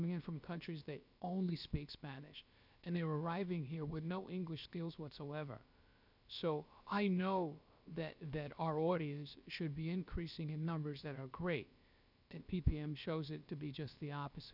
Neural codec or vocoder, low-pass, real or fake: codec, 16 kHz, about 1 kbps, DyCAST, with the encoder's durations; 5.4 kHz; fake